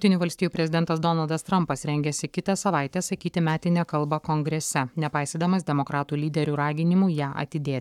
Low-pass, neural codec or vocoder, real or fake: 19.8 kHz; codec, 44.1 kHz, 7.8 kbps, Pupu-Codec; fake